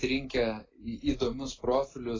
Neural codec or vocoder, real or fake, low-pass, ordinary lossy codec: none; real; 7.2 kHz; AAC, 32 kbps